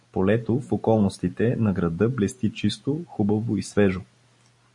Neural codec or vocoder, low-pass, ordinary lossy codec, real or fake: none; 10.8 kHz; MP3, 48 kbps; real